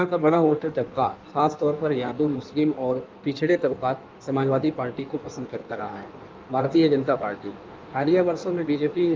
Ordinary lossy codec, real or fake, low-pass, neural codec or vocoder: Opus, 24 kbps; fake; 7.2 kHz; codec, 16 kHz in and 24 kHz out, 1.1 kbps, FireRedTTS-2 codec